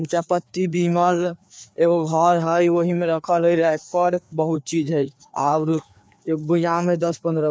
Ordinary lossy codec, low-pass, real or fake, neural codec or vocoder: none; none; fake; codec, 16 kHz, 2 kbps, FreqCodec, larger model